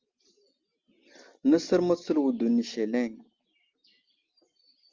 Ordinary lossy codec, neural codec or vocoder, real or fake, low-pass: Opus, 32 kbps; none; real; 7.2 kHz